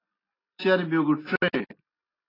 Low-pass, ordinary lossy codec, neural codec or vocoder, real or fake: 5.4 kHz; AAC, 24 kbps; none; real